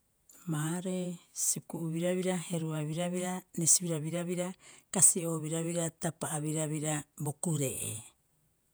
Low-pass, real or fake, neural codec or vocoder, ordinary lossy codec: none; fake; vocoder, 48 kHz, 128 mel bands, Vocos; none